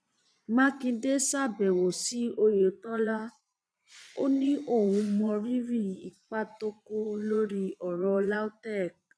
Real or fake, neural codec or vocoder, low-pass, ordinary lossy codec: fake; vocoder, 22.05 kHz, 80 mel bands, Vocos; none; none